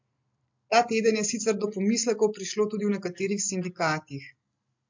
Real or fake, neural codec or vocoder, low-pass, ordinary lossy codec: real; none; 7.2 kHz; MP3, 48 kbps